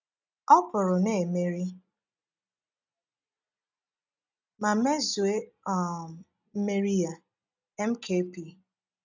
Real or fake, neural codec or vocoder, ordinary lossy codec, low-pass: real; none; none; 7.2 kHz